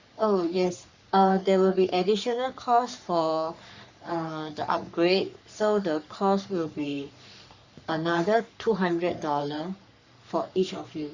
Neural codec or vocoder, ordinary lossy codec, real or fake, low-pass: codec, 44.1 kHz, 3.4 kbps, Pupu-Codec; Opus, 64 kbps; fake; 7.2 kHz